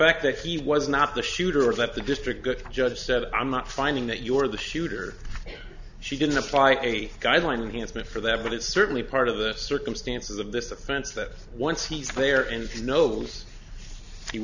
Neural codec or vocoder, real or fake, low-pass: none; real; 7.2 kHz